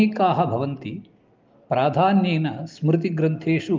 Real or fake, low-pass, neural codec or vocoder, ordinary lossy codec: real; 7.2 kHz; none; Opus, 32 kbps